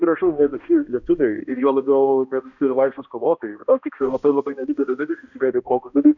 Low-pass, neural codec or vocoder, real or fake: 7.2 kHz; codec, 16 kHz, 1 kbps, X-Codec, HuBERT features, trained on balanced general audio; fake